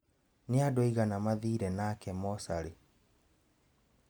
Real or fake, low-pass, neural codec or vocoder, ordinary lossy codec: real; none; none; none